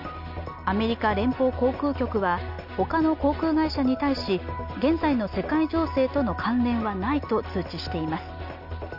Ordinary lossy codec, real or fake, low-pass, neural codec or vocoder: none; real; 5.4 kHz; none